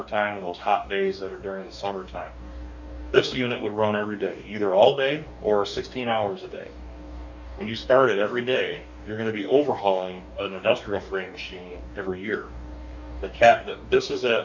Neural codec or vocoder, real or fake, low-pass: codec, 44.1 kHz, 2.6 kbps, DAC; fake; 7.2 kHz